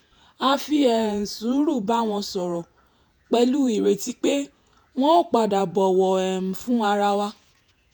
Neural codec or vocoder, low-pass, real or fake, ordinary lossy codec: vocoder, 48 kHz, 128 mel bands, Vocos; none; fake; none